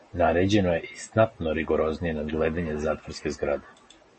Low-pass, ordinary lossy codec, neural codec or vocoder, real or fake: 10.8 kHz; MP3, 32 kbps; none; real